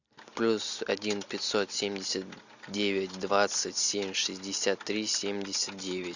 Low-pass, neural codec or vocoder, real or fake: 7.2 kHz; none; real